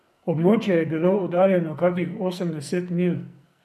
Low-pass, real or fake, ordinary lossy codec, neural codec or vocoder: 14.4 kHz; fake; none; codec, 44.1 kHz, 2.6 kbps, SNAC